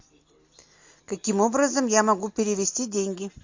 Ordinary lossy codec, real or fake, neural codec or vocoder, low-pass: MP3, 48 kbps; real; none; 7.2 kHz